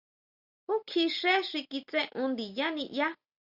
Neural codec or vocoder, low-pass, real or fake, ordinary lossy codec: none; 5.4 kHz; real; Opus, 64 kbps